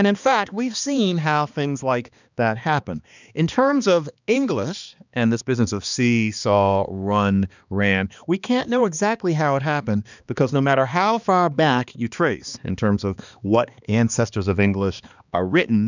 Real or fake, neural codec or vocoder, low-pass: fake; codec, 16 kHz, 2 kbps, X-Codec, HuBERT features, trained on balanced general audio; 7.2 kHz